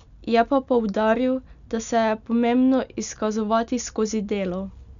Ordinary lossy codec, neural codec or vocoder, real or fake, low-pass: none; none; real; 7.2 kHz